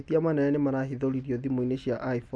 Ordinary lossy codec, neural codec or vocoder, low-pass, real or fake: none; none; none; real